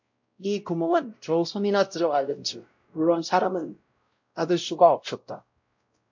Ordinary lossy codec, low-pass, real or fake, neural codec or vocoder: MP3, 48 kbps; 7.2 kHz; fake; codec, 16 kHz, 0.5 kbps, X-Codec, WavLM features, trained on Multilingual LibriSpeech